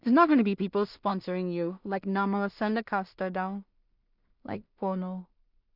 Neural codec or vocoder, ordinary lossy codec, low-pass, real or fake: codec, 16 kHz in and 24 kHz out, 0.4 kbps, LongCat-Audio-Codec, two codebook decoder; none; 5.4 kHz; fake